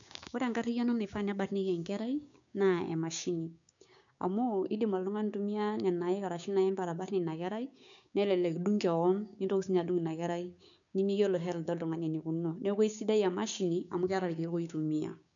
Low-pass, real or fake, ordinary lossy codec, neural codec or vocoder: 7.2 kHz; fake; none; codec, 16 kHz, 6 kbps, DAC